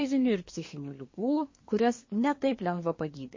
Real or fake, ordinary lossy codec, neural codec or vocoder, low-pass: fake; MP3, 32 kbps; codec, 24 kHz, 1 kbps, SNAC; 7.2 kHz